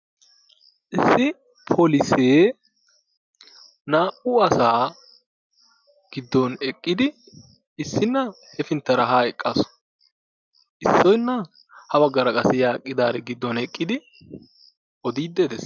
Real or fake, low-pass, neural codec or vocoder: real; 7.2 kHz; none